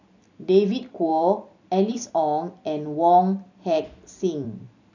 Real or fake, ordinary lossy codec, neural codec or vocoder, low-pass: fake; none; vocoder, 44.1 kHz, 128 mel bands every 256 samples, BigVGAN v2; 7.2 kHz